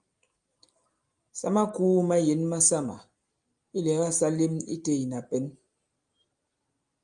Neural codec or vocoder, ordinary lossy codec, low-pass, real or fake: none; Opus, 32 kbps; 9.9 kHz; real